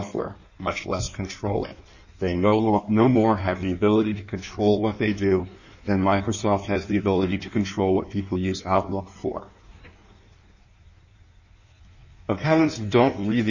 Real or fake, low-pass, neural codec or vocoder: fake; 7.2 kHz; codec, 16 kHz in and 24 kHz out, 1.1 kbps, FireRedTTS-2 codec